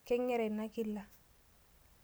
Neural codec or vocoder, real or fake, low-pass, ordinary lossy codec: none; real; none; none